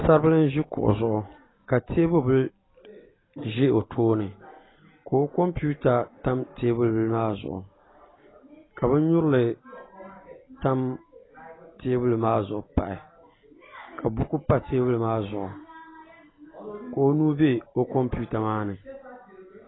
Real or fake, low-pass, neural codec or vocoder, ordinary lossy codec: real; 7.2 kHz; none; AAC, 16 kbps